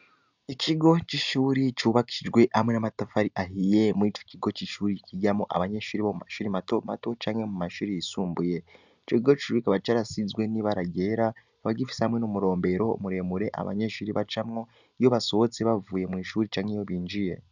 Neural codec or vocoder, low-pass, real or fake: none; 7.2 kHz; real